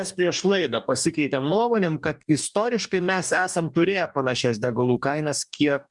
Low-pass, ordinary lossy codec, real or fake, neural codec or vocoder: 10.8 kHz; MP3, 96 kbps; fake; codec, 44.1 kHz, 2.6 kbps, DAC